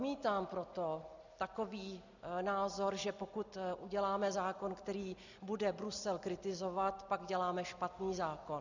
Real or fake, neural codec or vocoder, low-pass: real; none; 7.2 kHz